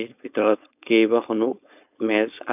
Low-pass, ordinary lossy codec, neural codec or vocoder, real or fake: 3.6 kHz; none; codec, 16 kHz, 4.8 kbps, FACodec; fake